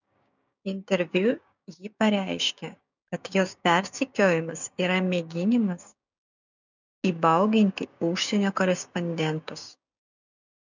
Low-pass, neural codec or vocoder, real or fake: 7.2 kHz; codec, 16 kHz, 6 kbps, DAC; fake